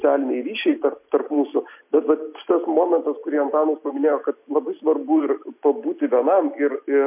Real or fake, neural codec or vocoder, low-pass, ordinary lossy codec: real; none; 3.6 kHz; MP3, 32 kbps